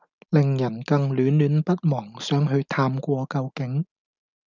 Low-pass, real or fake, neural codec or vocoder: 7.2 kHz; real; none